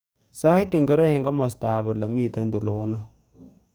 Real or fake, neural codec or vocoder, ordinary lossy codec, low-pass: fake; codec, 44.1 kHz, 2.6 kbps, DAC; none; none